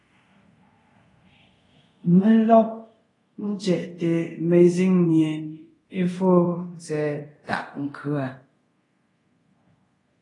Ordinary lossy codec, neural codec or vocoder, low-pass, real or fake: AAC, 32 kbps; codec, 24 kHz, 0.5 kbps, DualCodec; 10.8 kHz; fake